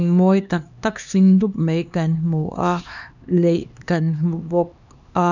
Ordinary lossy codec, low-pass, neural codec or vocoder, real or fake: none; 7.2 kHz; codec, 16 kHz, 2 kbps, X-Codec, HuBERT features, trained on LibriSpeech; fake